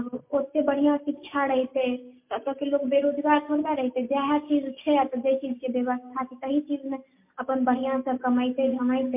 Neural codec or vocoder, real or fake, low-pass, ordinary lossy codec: none; real; 3.6 kHz; MP3, 32 kbps